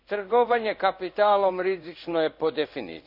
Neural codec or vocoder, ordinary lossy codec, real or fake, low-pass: codec, 16 kHz in and 24 kHz out, 1 kbps, XY-Tokenizer; none; fake; 5.4 kHz